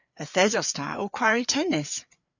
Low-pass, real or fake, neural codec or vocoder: 7.2 kHz; fake; codec, 16 kHz, 4 kbps, FreqCodec, larger model